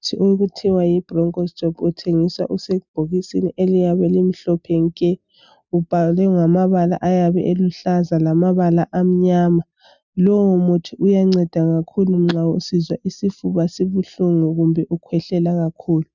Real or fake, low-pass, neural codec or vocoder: real; 7.2 kHz; none